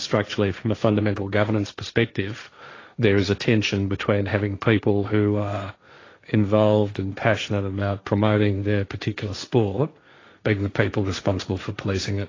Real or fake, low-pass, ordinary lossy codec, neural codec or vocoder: fake; 7.2 kHz; AAC, 32 kbps; codec, 16 kHz, 1.1 kbps, Voila-Tokenizer